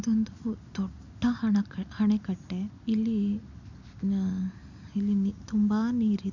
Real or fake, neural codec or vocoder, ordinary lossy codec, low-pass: real; none; none; 7.2 kHz